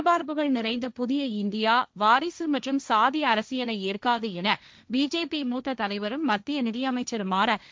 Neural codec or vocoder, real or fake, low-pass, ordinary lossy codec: codec, 16 kHz, 1.1 kbps, Voila-Tokenizer; fake; none; none